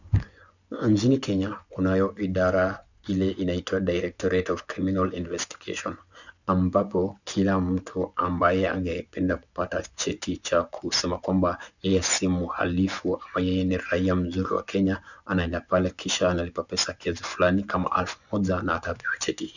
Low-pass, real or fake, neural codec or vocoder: 7.2 kHz; fake; vocoder, 24 kHz, 100 mel bands, Vocos